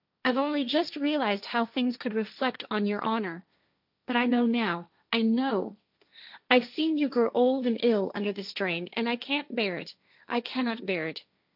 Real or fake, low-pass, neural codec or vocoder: fake; 5.4 kHz; codec, 16 kHz, 1.1 kbps, Voila-Tokenizer